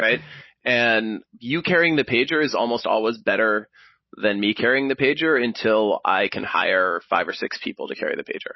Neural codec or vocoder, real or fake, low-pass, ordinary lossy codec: none; real; 7.2 kHz; MP3, 24 kbps